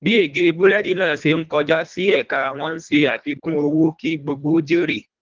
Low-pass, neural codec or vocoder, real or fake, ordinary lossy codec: 7.2 kHz; codec, 24 kHz, 1.5 kbps, HILCodec; fake; Opus, 24 kbps